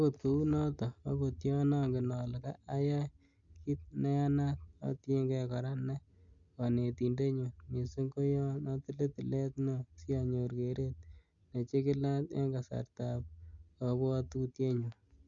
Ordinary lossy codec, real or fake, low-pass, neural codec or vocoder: Opus, 64 kbps; real; 7.2 kHz; none